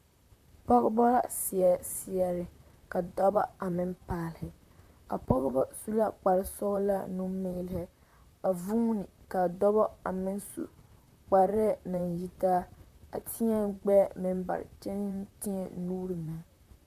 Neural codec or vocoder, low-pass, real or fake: vocoder, 44.1 kHz, 128 mel bands, Pupu-Vocoder; 14.4 kHz; fake